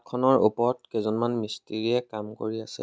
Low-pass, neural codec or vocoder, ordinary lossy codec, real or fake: none; none; none; real